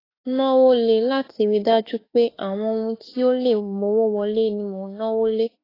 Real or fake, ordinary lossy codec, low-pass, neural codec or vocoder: fake; AAC, 24 kbps; 5.4 kHz; autoencoder, 48 kHz, 32 numbers a frame, DAC-VAE, trained on Japanese speech